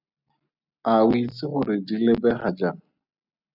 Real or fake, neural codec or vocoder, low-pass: real; none; 5.4 kHz